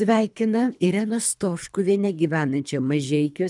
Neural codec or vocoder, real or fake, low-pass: codec, 24 kHz, 3 kbps, HILCodec; fake; 10.8 kHz